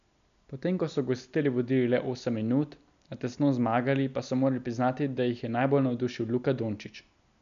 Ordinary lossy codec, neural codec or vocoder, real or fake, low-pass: none; none; real; 7.2 kHz